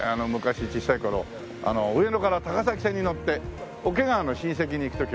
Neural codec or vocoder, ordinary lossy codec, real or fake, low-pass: none; none; real; none